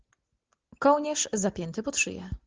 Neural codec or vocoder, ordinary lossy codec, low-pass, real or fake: none; Opus, 16 kbps; 7.2 kHz; real